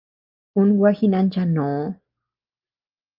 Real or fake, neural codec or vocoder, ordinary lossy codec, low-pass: real; none; Opus, 32 kbps; 5.4 kHz